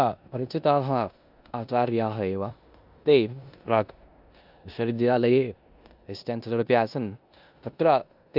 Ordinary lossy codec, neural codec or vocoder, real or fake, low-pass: Opus, 64 kbps; codec, 16 kHz in and 24 kHz out, 0.9 kbps, LongCat-Audio-Codec, four codebook decoder; fake; 5.4 kHz